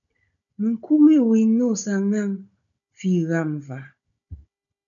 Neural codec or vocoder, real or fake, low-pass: codec, 16 kHz, 16 kbps, FunCodec, trained on Chinese and English, 50 frames a second; fake; 7.2 kHz